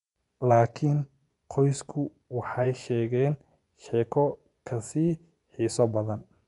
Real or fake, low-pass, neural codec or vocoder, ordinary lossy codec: fake; 10.8 kHz; vocoder, 24 kHz, 100 mel bands, Vocos; none